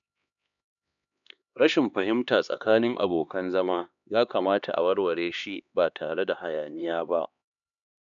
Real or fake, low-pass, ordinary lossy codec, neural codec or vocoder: fake; 7.2 kHz; none; codec, 16 kHz, 2 kbps, X-Codec, HuBERT features, trained on LibriSpeech